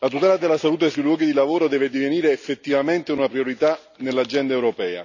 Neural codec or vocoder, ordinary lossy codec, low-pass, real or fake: none; none; 7.2 kHz; real